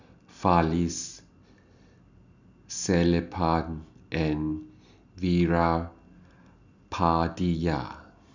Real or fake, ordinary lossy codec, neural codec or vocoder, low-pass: real; none; none; 7.2 kHz